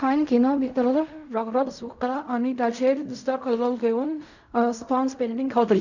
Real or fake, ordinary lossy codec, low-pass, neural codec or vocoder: fake; none; 7.2 kHz; codec, 16 kHz in and 24 kHz out, 0.4 kbps, LongCat-Audio-Codec, fine tuned four codebook decoder